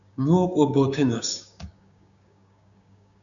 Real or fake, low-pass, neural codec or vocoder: fake; 7.2 kHz; codec, 16 kHz, 6 kbps, DAC